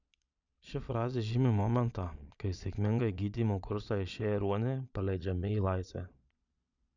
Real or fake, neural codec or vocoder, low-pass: fake; vocoder, 22.05 kHz, 80 mel bands, Vocos; 7.2 kHz